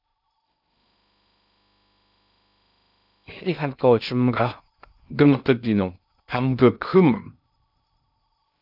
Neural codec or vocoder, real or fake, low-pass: codec, 16 kHz in and 24 kHz out, 0.6 kbps, FocalCodec, streaming, 2048 codes; fake; 5.4 kHz